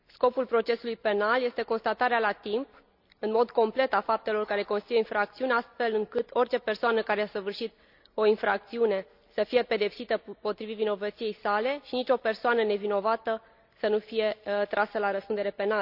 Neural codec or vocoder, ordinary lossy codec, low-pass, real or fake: none; none; 5.4 kHz; real